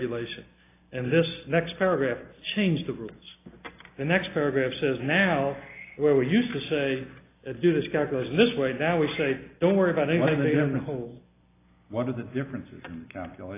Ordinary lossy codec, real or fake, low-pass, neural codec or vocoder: AAC, 24 kbps; real; 3.6 kHz; none